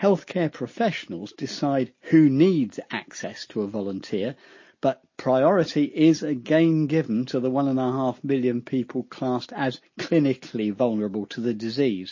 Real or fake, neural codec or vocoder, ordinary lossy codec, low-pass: real; none; MP3, 32 kbps; 7.2 kHz